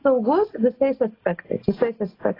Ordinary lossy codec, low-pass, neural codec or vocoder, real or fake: AAC, 24 kbps; 5.4 kHz; none; real